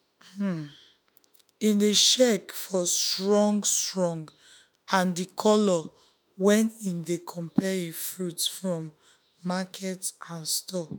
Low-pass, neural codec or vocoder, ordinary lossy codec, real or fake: none; autoencoder, 48 kHz, 32 numbers a frame, DAC-VAE, trained on Japanese speech; none; fake